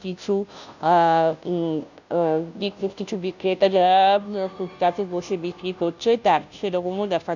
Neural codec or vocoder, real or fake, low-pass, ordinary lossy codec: codec, 16 kHz, 0.5 kbps, FunCodec, trained on Chinese and English, 25 frames a second; fake; 7.2 kHz; none